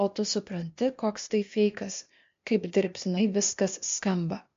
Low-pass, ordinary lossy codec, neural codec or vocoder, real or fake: 7.2 kHz; MP3, 48 kbps; codec, 16 kHz, 0.8 kbps, ZipCodec; fake